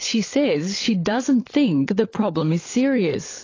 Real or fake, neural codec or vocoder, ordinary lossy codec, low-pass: fake; codec, 16 kHz, 16 kbps, FunCodec, trained on LibriTTS, 50 frames a second; AAC, 32 kbps; 7.2 kHz